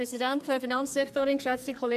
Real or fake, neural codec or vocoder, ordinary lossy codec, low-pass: fake; codec, 44.1 kHz, 2.6 kbps, SNAC; none; 14.4 kHz